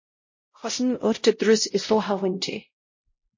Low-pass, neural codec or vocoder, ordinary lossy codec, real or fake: 7.2 kHz; codec, 16 kHz, 0.5 kbps, X-Codec, WavLM features, trained on Multilingual LibriSpeech; MP3, 32 kbps; fake